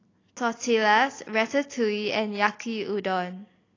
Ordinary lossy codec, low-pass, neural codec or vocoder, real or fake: AAC, 32 kbps; 7.2 kHz; none; real